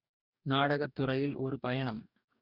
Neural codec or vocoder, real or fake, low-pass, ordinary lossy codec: codec, 44.1 kHz, 2.6 kbps, DAC; fake; 5.4 kHz; none